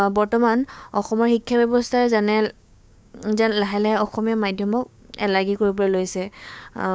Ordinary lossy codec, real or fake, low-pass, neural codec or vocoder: none; fake; none; codec, 16 kHz, 6 kbps, DAC